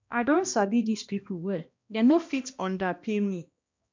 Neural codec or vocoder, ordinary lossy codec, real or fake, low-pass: codec, 16 kHz, 1 kbps, X-Codec, HuBERT features, trained on balanced general audio; MP3, 64 kbps; fake; 7.2 kHz